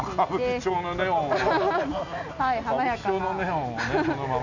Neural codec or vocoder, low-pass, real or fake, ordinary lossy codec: none; 7.2 kHz; real; none